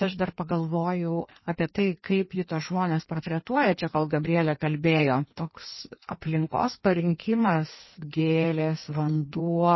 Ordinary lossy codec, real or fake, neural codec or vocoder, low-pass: MP3, 24 kbps; fake; codec, 16 kHz in and 24 kHz out, 1.1 kbps, FireRedTTS-2 codec; 7.2 kHz